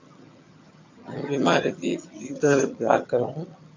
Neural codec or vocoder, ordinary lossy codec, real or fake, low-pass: vocoder, 22.05 kHz, 80 mel bands, HiFi-GAN; AAC, 48 kbps; fake; 7.2 kHz